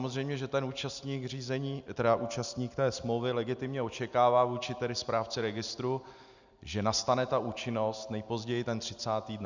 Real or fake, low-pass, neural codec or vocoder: real; 7.2 kHz; none